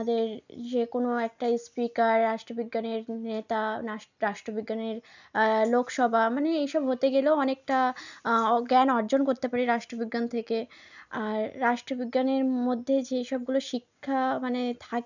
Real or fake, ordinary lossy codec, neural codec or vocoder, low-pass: real; none; none; 7.2 kHz